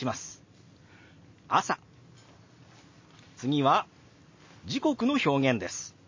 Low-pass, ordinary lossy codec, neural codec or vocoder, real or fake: 7.2 kHz; MP3, 32 kbps; none; real